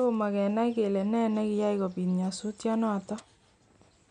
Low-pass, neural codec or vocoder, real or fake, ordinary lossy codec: 9.9 kHz; none; real; none